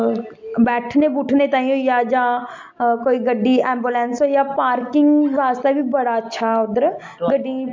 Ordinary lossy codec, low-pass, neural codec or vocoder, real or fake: MP3, 48 kbps; 7.2 kHz; none; real